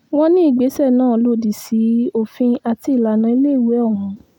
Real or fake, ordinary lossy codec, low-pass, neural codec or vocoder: real; none; 19.8 kHz; none